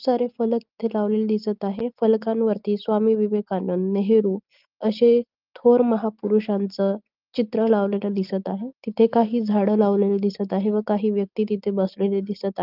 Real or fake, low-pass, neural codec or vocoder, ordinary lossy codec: real; 5.4 kHz; none; Opus, 24 kbps